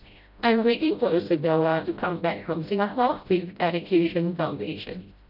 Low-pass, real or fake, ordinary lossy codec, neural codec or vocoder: 5.4 kHz; fake; none; codec, 16 kHz, 0.5 kbps, FreqCodec, smaller model